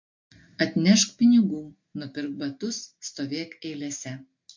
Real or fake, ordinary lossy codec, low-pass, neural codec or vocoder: real; MP3, 48 kbps; 7.2 kHz; none